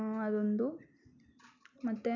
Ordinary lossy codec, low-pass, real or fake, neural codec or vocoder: none; 7.2 kHz; real; none